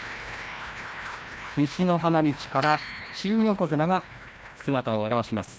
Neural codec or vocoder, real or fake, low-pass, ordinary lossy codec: codec, 16 kHz, 1 kbps, FreqCodec, larger model; fake; none; none